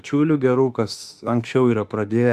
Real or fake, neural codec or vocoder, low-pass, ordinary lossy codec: fake; autoencoder, 48 kHz, 32 numbers a frame, DAC-VAE, trained on Japanese speech; 14.4 kHz; Opus, 64 kbps